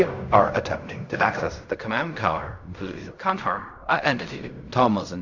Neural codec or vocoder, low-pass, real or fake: codec, 16 kHz in and 24 kHz out, 0.4 kbps, LongCat-Audio-Codec, fine tuned four codebook decoder; 7.2 kHz; fake